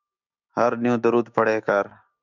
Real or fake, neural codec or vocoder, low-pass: fake; codec, 16 kHz, 6 kbps, DAC; 7.2 kHz